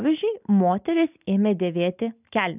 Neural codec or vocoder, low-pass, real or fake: none; 3.6 kHz; real